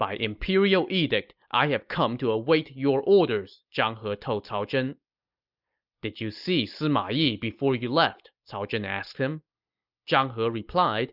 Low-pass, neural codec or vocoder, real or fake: 5.4 kHz; none; real